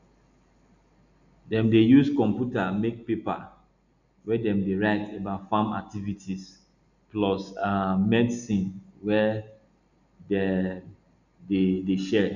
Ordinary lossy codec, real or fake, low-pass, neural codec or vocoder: none; real; 7.2 kHz; none